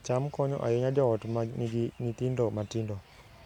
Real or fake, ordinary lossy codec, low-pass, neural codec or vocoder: real; none; 19.8 kHz; none